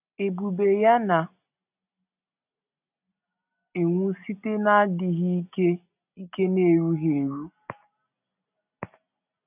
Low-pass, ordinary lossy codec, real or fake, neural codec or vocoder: 3.6 kHz; none; real; none